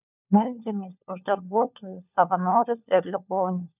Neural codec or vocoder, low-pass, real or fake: codec, 16 kHz, 4 kbps, FunCodec, trained on LibriTTS, 50 frames a second; 3.6 kHz; fake